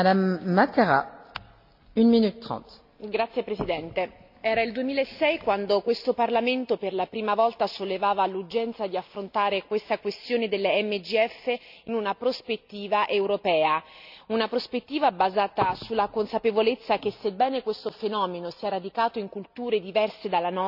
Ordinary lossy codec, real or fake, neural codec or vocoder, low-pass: none; real; none; 5.4 kHz